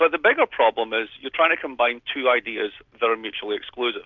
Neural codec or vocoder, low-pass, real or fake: none; 7.2 kHz; real